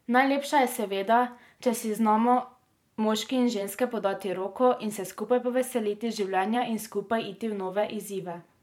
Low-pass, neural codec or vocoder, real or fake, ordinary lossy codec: 19.8 kHz; vocoder, 44.1 kHz, 128 mel bands every 512 samples, BigVGAN v2; fake; MP3, 96 kbps